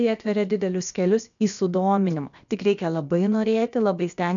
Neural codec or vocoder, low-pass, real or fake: codec, 16 kHz, about 1 kbps, DyCAST, with the encoder's durations; 7.2 kHz; fake